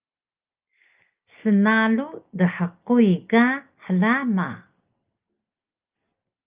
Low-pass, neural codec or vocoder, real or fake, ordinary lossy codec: 3.6 kHz; none; real; Opus, 24 kbps